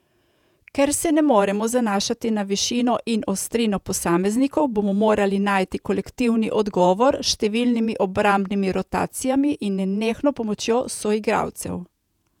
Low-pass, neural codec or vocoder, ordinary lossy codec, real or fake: 19.8 kHz; vocoder, 48 kHz, 128 mel bands, Vocos; none; fake